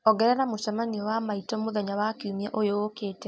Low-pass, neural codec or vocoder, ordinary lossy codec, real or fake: none; none; none; real